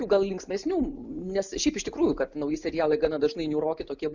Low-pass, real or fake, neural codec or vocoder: 7.2 kHz; real; none